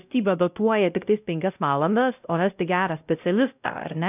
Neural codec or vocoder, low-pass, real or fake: codec, 16 kHz, 0.5 kbps, X-Codec, WavLM features, trained on Multilingual LibriSpeech; 3.6 kHz; fake